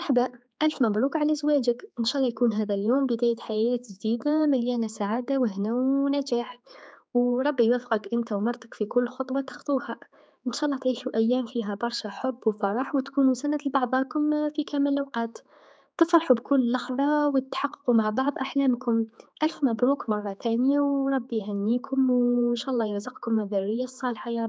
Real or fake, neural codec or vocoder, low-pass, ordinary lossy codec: fake; codec, 16 kHz, 4 kbps, X-Codec, HuBERT features, trained on general audio; none; none